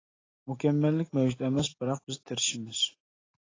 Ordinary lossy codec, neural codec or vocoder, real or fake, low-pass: AAC, 32 kbps; none; real; 7.2 kHz